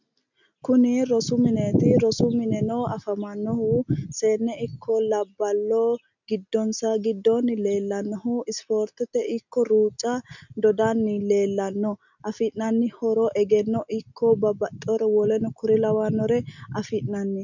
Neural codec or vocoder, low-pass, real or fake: none; 7.2 kHz; real